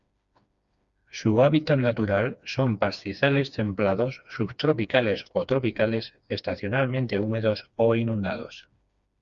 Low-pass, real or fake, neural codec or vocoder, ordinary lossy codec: 7.2 kHz; fake; codec, 16 kHz, 2 kbps, FreqCodec, smaller model; Opus, 64 kbps